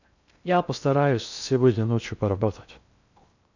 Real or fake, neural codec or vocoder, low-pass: fake; codec, 16 kHz in and 24 kHz out, 0.6 kbps, FocalCodec, streaming, 2048 codes; 7.2 kHz